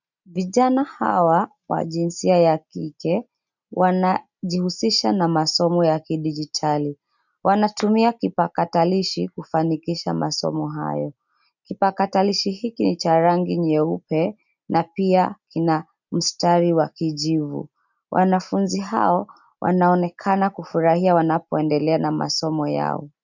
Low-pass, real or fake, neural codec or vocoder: 7.2 kHz; real; none